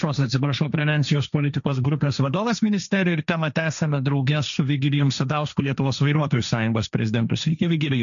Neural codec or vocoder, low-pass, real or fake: codec, 16 kHz, 1.1 kbps, Voila-Tokenizer; 7.2 kHz; fake